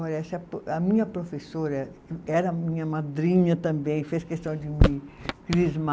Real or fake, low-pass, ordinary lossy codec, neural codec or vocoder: real; none; none; none